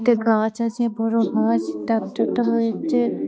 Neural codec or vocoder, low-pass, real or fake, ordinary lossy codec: codec, 16 kHz, 2 kbps, X-Codec, HuBERT features, trained on balanced general audio; none; fake; none